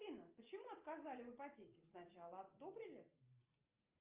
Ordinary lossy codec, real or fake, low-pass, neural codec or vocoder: Opus, 24 kbps; real; 3.6 kHz; none